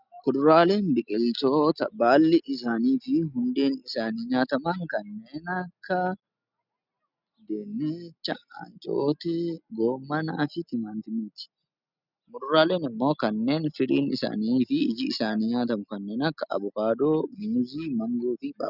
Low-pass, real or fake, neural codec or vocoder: 5.4 kHz; real; none